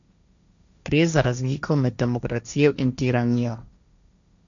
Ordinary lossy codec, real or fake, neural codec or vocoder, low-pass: none; fake; codec, 16 kHz, 1.1 kbps, Voila-Tokenizer; 7.2 kHz